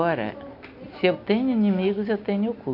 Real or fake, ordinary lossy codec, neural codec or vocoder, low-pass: real; none; none; 5.4 kHz